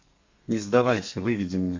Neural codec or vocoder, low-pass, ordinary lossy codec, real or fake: codec, 32 kHz, 1.9 kbps, SNAC; 7.2 kHz; MP3, 48 kbps; fake